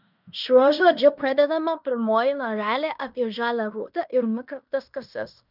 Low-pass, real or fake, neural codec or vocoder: 5.4 kHz; fake; codec, 16 kHz in and 24 kHz out, 0.9 kbps, LongCat-Audio-Codec, fine tuned four codebook decoder